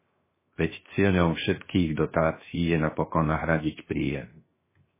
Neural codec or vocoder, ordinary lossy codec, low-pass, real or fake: codec, 16 kHz, 0.7 kbps, FocalCodec; MP3, 16 kbps; 3.6 kHz; fake